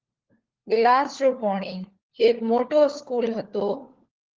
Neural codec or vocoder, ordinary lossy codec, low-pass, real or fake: codec, 16 kHz, 4 kbps, FunCodec, trained on LibriTTS, 50 frames a second; Opus, 16 kbps; 7.2 kHz; fake